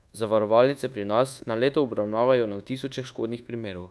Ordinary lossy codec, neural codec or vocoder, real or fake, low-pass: none; codec, 24 kHz, 1.2 kbps, DualCodec; fake; none